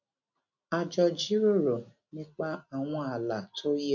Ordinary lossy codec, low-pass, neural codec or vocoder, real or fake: none; 7.2 kHz; none; real